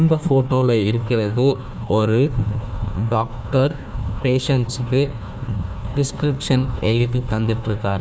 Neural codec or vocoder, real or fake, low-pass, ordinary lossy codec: codec, 16 kHz, 1 kbps, FunCodec, trained on Chinese and English, 50 frames a second; fake; none; none